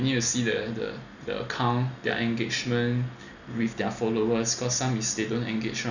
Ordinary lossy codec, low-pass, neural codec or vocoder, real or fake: none; 7.2 kHz; none; real